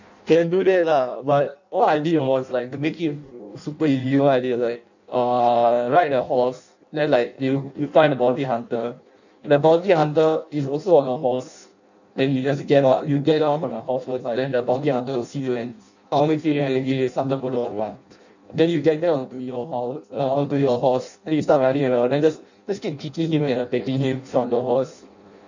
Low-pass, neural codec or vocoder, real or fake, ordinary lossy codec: 7.2 kHz; codec, 16 kHz in and 24 kHz out, 0.6 kbps, FireRedTTS-2 codec; fake; none